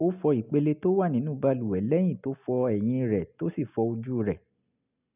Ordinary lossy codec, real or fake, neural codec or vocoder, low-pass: none; real; none; 3.6 kHz